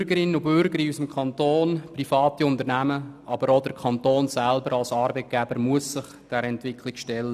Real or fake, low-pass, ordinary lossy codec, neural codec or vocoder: real; 14.4 kHz; none; none